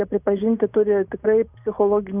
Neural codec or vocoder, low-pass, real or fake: none; 3.6 kHz; real